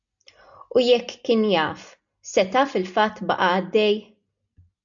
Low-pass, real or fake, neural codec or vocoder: 7.2 kHz; real; none